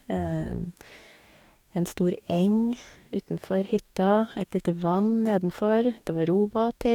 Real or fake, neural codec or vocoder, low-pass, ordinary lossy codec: fake; codec, 44.1 kHz, 2.6 kbps, DAC; 19.8 kHz; none